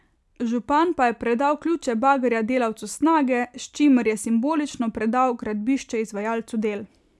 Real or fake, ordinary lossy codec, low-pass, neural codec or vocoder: real; none; none; none